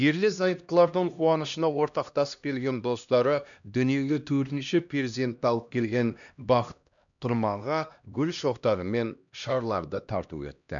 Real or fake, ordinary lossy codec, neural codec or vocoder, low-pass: fake; none; codec, 16 kHz, 1 kbps, X-Codec, HuBERT features, trained on LibriSpeech; 7.2 kHz